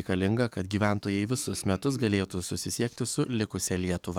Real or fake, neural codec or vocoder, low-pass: fake; codec, 44.1 kHz, 7.8 kbps, DAC; 19.8 kHz